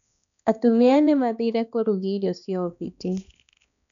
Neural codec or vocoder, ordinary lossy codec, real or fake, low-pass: codec, 16 kHz, 2 kbps, X-Codec, HuBERT features, trained on balanced general audio; none; fake; 7.2 kHz